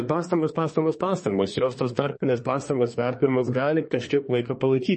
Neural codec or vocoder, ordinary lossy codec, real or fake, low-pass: codec, 24 kHz, 1 kbps, SNAC; MP3, 32 kbps; fake; 10.8 kHz